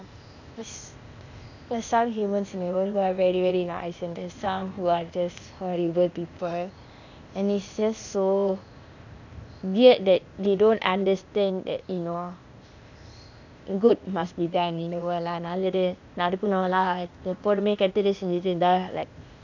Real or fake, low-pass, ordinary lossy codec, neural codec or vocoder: fake; 7.2 kHz; none; codec, 16 kHz, 0.8 kbps, ZipCodec